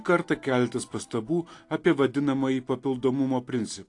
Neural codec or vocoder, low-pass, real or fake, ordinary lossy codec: none; 10.8 kHz; real; AAC, 48 kbps